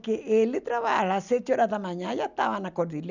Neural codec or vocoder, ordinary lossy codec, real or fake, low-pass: none; none; real; 7.2 kHz